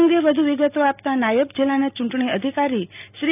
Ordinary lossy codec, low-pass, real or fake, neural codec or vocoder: none; 3.6 kHz; real; none